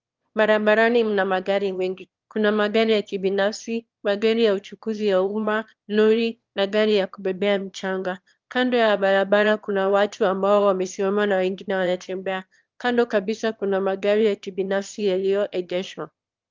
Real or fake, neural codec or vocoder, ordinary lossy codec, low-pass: fake; autoencoder, 22.05 kHz, a latent of 192 numbers a frame, VITS, trained on one speaker; Opus, 32 kbps; 7.2 kHz